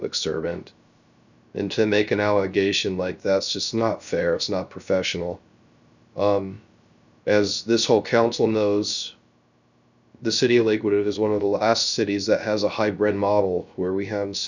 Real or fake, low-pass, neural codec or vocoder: fake; 7.2 kHz; codec, 16 kHz, 0.3 kbps, FocalCodec